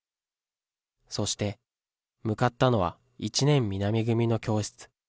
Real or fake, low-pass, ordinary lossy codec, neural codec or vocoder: real; none; none; none